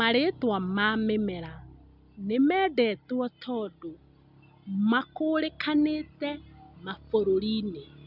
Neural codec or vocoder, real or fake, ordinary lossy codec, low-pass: none; real; none; 5.4 kHz